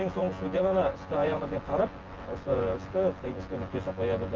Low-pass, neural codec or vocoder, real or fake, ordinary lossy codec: 7.2 kHz; vocoder, 24 kHz, 100 mel bands, Vocos; fake; Opus, 16 kbps